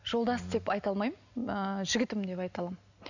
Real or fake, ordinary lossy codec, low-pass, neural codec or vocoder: real; none; 7.2 kHz; none